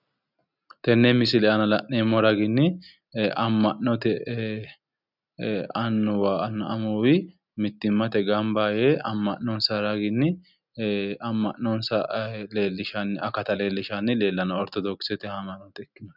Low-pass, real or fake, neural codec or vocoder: 5.4 kHz; real; none